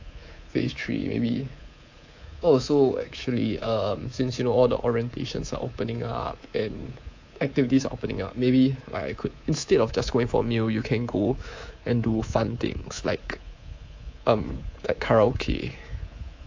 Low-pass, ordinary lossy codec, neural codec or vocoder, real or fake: 7.2 kHz; AAC, 48 kbps; codec, 24 kHz, 3.1 kbps, DualCodec; fake